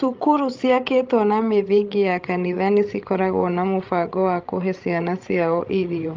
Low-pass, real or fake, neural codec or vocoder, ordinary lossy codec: 7.2 kHz; fake; codec, 16 kHz, 16 kbps, FreqCodec, larger model; Opus, 32 kbps